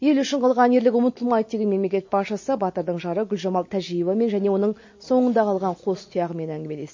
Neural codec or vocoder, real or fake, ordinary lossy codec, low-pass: none; real; MP3, 32 kbps; 7.2 kHz